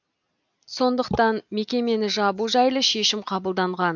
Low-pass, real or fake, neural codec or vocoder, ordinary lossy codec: 7.2 kHz; real; none; MP3, 64 kbps